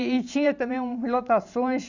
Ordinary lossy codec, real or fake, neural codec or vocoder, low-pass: none; fake; vocoder, 44.1 kHz, 128 mel bands every 512 samples, BigVGAN v2; 7.2 kHz